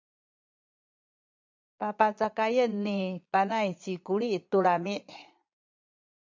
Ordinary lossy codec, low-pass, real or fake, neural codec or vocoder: MP3, 64 kbps; 7.2 kHz; fake; vocoder, 22.05 kHz, 80 mel bands, Vocos